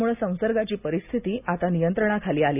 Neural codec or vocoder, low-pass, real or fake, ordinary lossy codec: none; 3.6 kHz; real; none